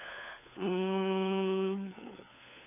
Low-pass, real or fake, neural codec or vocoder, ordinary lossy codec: 3.6 kHz; fake; codec, 16 kHz, 4 kbps, FunCodec, trained on LibriTTS, 50 frames a second; none